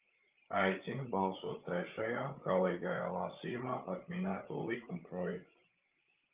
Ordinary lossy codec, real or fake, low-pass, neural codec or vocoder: Opus, 32 kbps; fake; 3.6 kHz; codec, 16 kHz, 8 kbps, FreqCodec, larger model